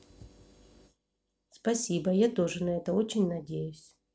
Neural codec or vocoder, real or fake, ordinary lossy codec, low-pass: none; real; none; none